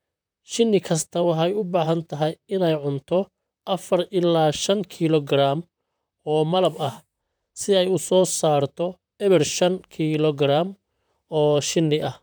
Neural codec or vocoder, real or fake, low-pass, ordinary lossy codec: vocoder, 44.1 kHz, 128 mel bands, Pupu-Vocoder; fake; none; none